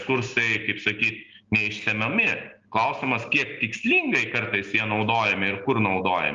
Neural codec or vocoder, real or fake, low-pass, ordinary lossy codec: none; real; 7.2 kHz; Opus, 32 kbps